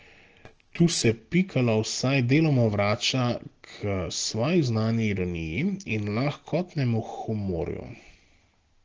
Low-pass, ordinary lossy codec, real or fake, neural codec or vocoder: 7.2 kHz; Opus, 16 kbps; real; none